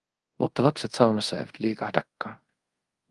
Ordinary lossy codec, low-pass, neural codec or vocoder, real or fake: Opus, 24 kbps; 10.8 kHz; codec, 24 kHz, 0.5 kbps, DualCodec; fake